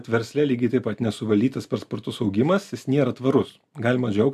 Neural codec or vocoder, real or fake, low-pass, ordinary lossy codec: none; real; 14.4 kHz; AAC, 96 kbps